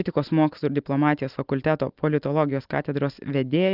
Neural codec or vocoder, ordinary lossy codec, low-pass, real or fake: none; Opus, 32 kbps; 5.4 kHz; real